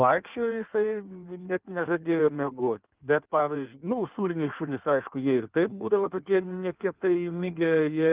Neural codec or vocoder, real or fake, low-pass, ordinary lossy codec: codec, 16 kHz in and 24 kHz out, 1.1 kbps, FireRedTTS-2 codec; fake; 3.6 kHz; Opus, 24 kbps